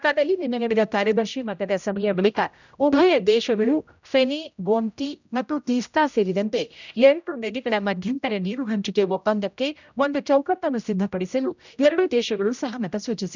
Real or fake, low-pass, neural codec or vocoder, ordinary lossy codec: fake; 7.2 kHz; codec, 16 kHz, 0.5 kbps, X-Codec, HuBERT features, trained on general audio; none